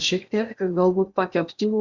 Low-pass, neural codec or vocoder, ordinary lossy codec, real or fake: 7.2 kHz; codec, 16 kHz in and 24 kHz out, 0.8 kbps, FocalCodec, streaming, 65536 codes; Opus, 64 kbps; fake